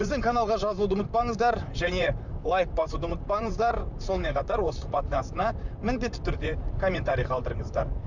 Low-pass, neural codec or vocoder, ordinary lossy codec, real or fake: 7.2 kHz; vocoder, 44.1 kHz, 128 mel bands, Pupu-Vocoder; none; fake